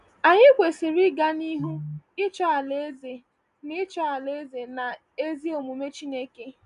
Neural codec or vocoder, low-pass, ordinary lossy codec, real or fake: none; 10.8 kHz; none; real